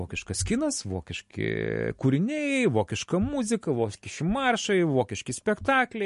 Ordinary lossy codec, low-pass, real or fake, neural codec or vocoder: MP3, 48 kbps; 10.8 kHz; real; none